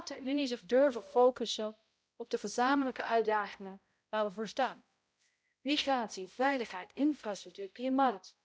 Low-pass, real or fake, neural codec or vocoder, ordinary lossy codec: none; fake; codec, 16 kHz, 0.5 kbps, X-Codec, HuBERT features, trained on balanced general audio; none